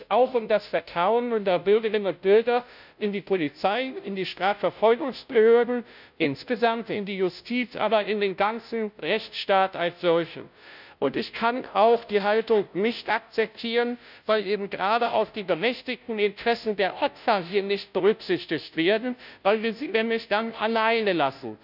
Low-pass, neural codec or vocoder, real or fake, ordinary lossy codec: 5.4 kHz; codec, 16 kHz, 0.5 kbps, FunCodec, trained on Chinese and English, 25 frames a second; fake; none